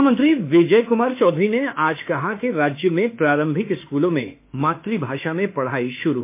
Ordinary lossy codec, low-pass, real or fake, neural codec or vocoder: MP3, 24 kbps; 3.6 kHz; fake; autoencoder, 48 kHz, 32 numbers a frame, DAC-VAE, trained on Japanese speech